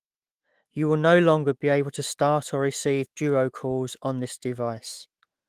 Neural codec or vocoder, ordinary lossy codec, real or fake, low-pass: autoencoder, 48 kHz, 128 numbers a frame, DAC-VAE, trained on Japanese speech; Opus, 24 kbps; fake; 14.4 kHz